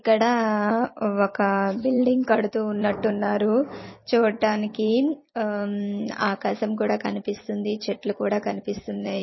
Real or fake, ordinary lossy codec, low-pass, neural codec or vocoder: fake; MP3, 24 kbps; 7.2 kHz; vocoder, 44.1 kHz, 128 mel bands every 256 samples, BigVGAN v2